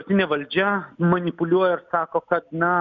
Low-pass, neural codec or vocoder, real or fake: 7.2 kHz; none; real